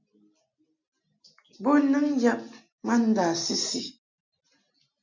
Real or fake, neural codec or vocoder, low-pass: real; none; 7.2 kHz